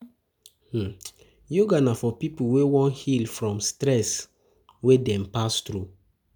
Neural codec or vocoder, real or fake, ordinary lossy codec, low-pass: none; real; none; none